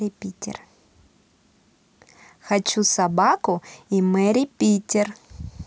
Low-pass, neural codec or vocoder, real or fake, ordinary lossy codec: none; none; real; none